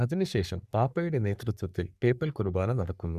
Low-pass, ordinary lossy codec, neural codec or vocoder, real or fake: 14.4 kHz; none; codec, 44.1 kHz, 3.4 kbps, Pupu-Codec; fake